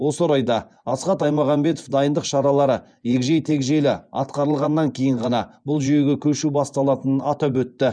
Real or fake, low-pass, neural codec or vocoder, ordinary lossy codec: fake; 9.9 kHz; vocoder, 24 kHz, 100 mel bands, Vocos; none